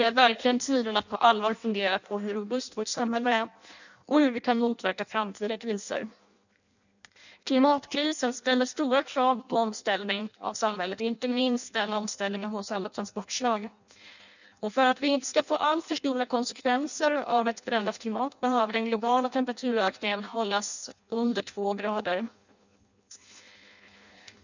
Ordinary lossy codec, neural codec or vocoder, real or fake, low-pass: none; codec, 16 kHz in and 24 kHz out, 0.6 kbps, FireRedTTS-2 codec; fake; 7.2 kHz